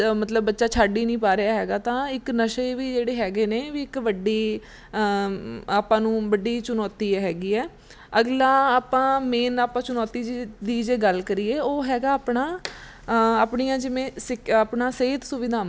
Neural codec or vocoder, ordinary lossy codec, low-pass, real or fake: none; none; none; real